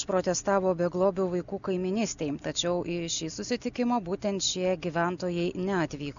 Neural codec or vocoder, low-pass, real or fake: none; 7.2 kHz; real